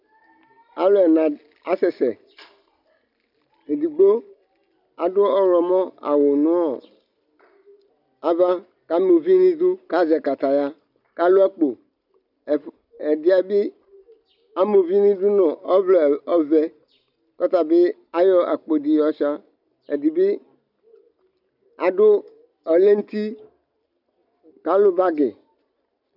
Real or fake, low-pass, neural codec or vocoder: real; 5.4 kHz; none